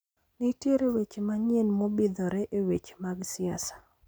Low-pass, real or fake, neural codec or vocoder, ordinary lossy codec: none; real; none; none